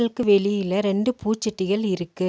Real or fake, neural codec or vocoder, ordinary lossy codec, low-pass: real; none; none; none